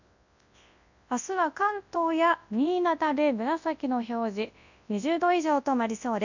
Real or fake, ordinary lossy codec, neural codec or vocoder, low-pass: fake; none; codec, 24 kHz, 0.9 kbps, WavTokenizer, large speech release; 7.2 kHz